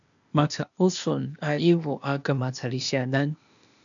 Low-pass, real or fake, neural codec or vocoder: 7.2 kHz; fake; codec, 16 kHz, 0.8 kbps, ZipCodec